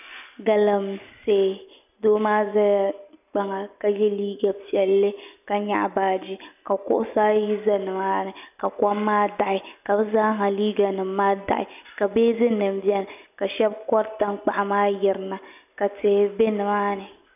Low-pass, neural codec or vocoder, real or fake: 3.6 kHz; none; real